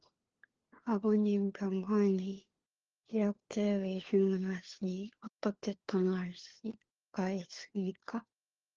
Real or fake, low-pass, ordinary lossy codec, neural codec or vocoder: fake; 7.2 kHz; Opus, 16 kbps; codec, 16 kHz, 4 kbps, FunCodec, trained on LibriTTS, 50 frames a second